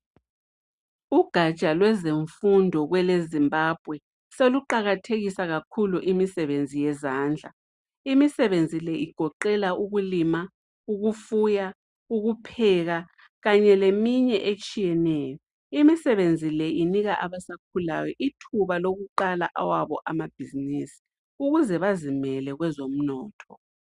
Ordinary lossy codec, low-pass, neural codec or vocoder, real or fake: Opus, 64 kbps; 10.8 kHz; none; real